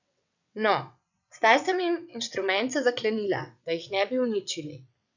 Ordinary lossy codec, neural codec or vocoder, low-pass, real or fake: none; vocoder, 22.05 kHz, 80 mel bands, WaveNeXt; 7.2 kHz; fake